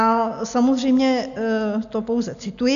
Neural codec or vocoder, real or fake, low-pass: none; real; 7.2 kHz